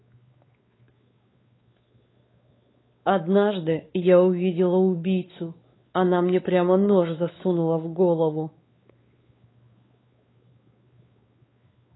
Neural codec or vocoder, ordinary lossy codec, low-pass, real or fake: codec, 16 kHz, 4 kbps, X-Codec, WavLM features, trained on Multilingual LibriSpeech; AAC, 16 kbps; 7.2 kHz; fake